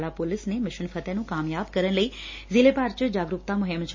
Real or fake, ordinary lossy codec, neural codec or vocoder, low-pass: real; MP3, 32 kbps; none; 7.2 kHz